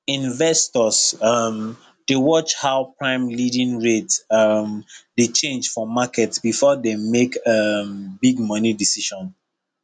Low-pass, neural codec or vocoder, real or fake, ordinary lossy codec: 9.9 kHz; none; real; none